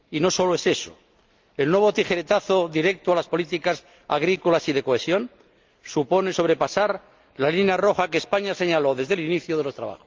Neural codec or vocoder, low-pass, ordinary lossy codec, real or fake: none; 7.2 kHz; Opus, 32 kbps; real